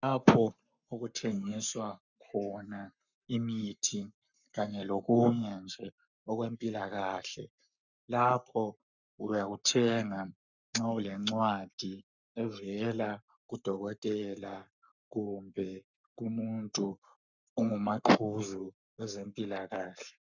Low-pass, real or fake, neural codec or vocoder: 7.2 kHz; fake; codec, 44.1 kHz, 7.8 kbps, Pupu-Codec